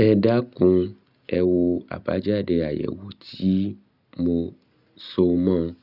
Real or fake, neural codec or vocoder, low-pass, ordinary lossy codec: real; none; 5.4 kHz; none